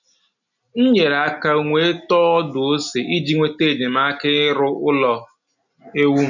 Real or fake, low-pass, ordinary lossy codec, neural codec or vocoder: real; 7.2 kHz; none; none